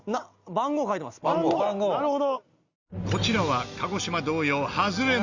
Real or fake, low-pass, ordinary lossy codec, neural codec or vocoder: real; 7.2 kHz; Opus, 64 kbps; none